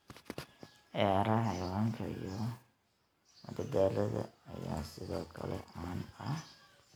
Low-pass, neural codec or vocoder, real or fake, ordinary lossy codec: none; none; real; none